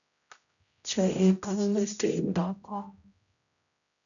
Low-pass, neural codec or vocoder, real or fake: 7.2 kHz; codec, 16 kHz, 0.5 kbps, X-Codec, HuBERT features, trained on general audio; fake